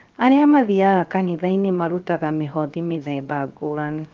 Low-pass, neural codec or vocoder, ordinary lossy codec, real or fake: 7.2 kHz; codec, 16 kHz, 0.7 kbps, FocalCodec; Opus, 24 kbps; fake